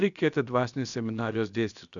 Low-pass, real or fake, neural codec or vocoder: 7.2 kHz; fake; codec, 16 kHz, about 1 kbps, DyCAST, with the encoder's durations